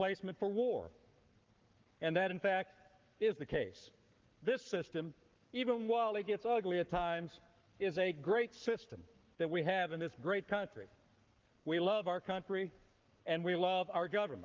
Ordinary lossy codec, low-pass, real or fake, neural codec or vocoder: Opus, 32 kbps; 7.2 kHz; fake; codec, 44.1 kHz, 7.8 kbps, Pupu-Codec